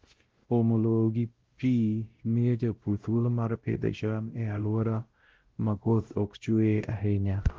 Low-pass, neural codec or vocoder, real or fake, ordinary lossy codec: 7.2 kHz; codec, 16 kHz, 0.5 kbps, X-Codec, WavLM features, trained on Multilingual LibriSpeech; fake; Opus, 16 kbps